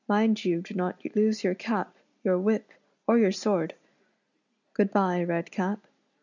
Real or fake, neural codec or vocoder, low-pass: real; none; 7.2 kHz